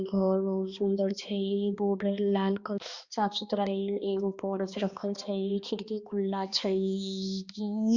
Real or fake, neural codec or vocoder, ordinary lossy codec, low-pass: fake; codec, 16 kHz, 2 kbps, X-Codec, HuBERT features, trained on balanced general audio; Opus, 64 kbps; 7.2 kHz